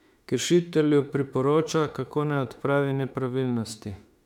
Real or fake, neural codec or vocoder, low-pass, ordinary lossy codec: fake; autoencoder, 48 kHz, 32 numbers a frame, DAC-VAE, trained on Japanese speech; 19.8 kHz; none